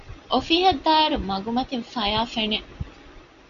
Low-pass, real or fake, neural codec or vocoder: 7.2 kHz; real; none